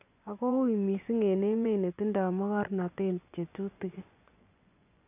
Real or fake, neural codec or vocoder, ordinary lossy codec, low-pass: fake; vocoder, 24 kHz, 100 mel bands, Vocos; none; 3.6 kHz